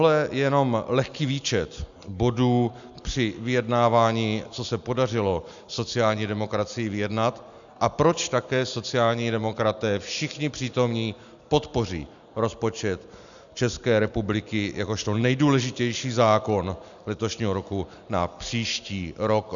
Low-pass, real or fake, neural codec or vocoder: 7.2 kHz; real; none